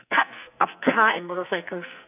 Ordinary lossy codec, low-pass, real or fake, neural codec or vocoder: none; 3.6 kHz; fake; codec, 44.1 kHz, 2.6 kbps, SNAC